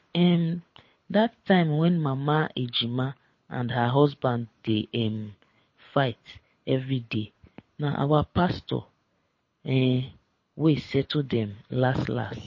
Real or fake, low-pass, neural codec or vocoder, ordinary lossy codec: fake; 7.2 kHz; codec, 24 kHz, 6 kbps, HILCodec; MP3, 32 kbps